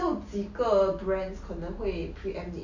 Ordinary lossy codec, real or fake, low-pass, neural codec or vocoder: MP3, 48 kbps; real; 7.2 kHz; none